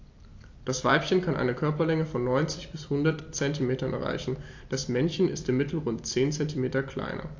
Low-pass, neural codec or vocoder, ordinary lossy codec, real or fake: 7.2 kHz; none; AAC, 48 kbps; real